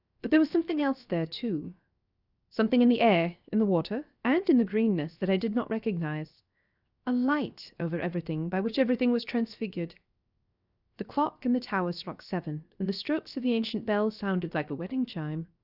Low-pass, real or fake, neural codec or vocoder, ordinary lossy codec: 5.4 kHz; fake; codec, 16 kHz, 0.7 kbps, FocalCodec; Opus, 64 kbps